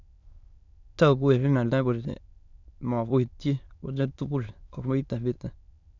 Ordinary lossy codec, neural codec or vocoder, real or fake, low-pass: none; autoencoder, 22.05 kHz, a latent of 192 numbers a frame, VITS, trained on many speakers; fake; 7.2 kHz